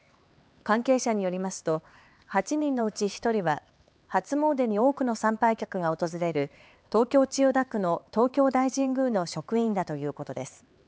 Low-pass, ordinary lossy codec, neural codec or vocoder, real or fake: none; none; codec, 16 kHz, 4 kbps, X-Codec, HuBERT features, trained on LibriSpeech; fake